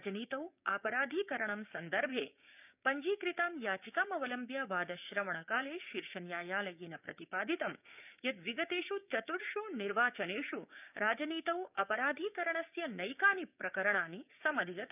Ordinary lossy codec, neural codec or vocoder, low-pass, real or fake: none; codec, 16 kHz, 6 kbps, DAC; 3.6 kHz; fake